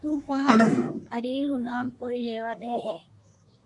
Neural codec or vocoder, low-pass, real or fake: codec, 24 kHz, 1 kbps, SNAC; 10.8 kHz; fake